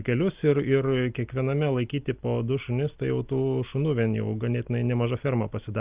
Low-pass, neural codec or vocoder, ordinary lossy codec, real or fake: 3.6 kHz; none; Opus, 32 kbps; real